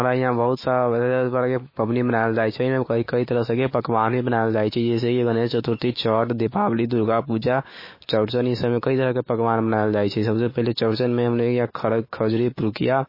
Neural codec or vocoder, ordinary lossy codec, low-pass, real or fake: autoencoder, 48 kHz, 128 numbers a frame, DAC-VAE, trained on Japanese speech; MP3, 24 kbps; 5.4 kHz; fake